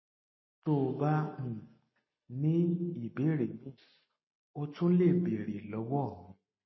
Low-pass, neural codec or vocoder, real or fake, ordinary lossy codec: 7.2 kHz; none; real; MP3, 24 kbps